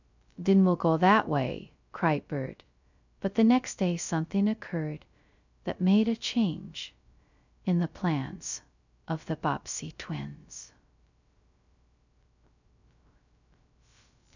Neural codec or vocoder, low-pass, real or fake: codec, 16 kHz, 0.2 kbps, FocalCodec; 7.2 kHz; fake